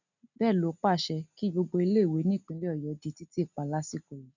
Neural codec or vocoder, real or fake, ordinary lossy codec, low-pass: none; real; none; 7.2 kHz